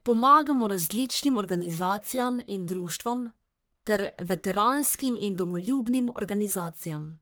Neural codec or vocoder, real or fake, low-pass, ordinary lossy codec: codec, 44.1 kHz, 1.7 kbps, Pupu-Codec; fake; none; none